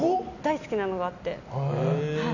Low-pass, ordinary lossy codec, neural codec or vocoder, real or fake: 7.2 kHz; none; none; real